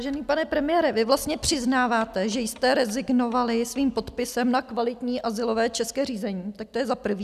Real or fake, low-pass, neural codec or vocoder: real; 14.4 kHz; none